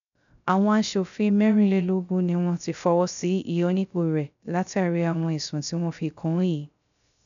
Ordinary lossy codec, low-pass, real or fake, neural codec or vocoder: none; 7.2 kHz; fake; codec, 16 kHz, 0.3 kbps, FocalCodec